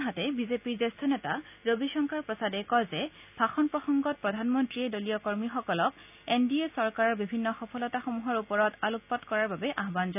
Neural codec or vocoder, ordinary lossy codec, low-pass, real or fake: none; none; 3.6 kHz; real